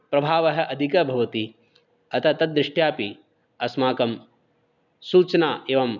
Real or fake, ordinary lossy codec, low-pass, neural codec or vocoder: real; none; 7.2 kHz; none